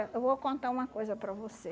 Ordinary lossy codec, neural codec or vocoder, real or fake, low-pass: none; none; real; none